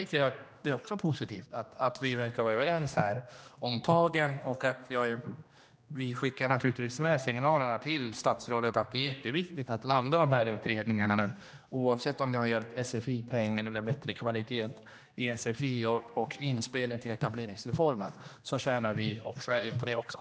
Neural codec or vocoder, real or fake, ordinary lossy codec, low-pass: codec, 16 kHz, 1 kbps, X-Codec, HuBERT features, trained on general audio; fake; none; none